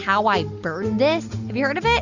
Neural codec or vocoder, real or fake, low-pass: none; real; 7.2 kHz